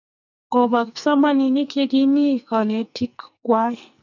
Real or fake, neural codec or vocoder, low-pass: fake; codec, 44.1 kHz, 2.6 kbps, SNAC; 7.2 kHz